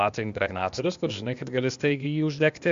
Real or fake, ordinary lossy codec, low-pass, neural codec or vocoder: fake; AAC, 64 kbps; 7.2 kHz; codec, 16 kHz, 0.8 kbps, ZipCodec